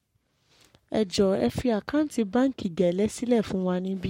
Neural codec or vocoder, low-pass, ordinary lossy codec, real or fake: codec, 44.1 kHz, 7.8 kbps, Pupu-Codec; 19.8 kHz; MP3, 64 kbps; fake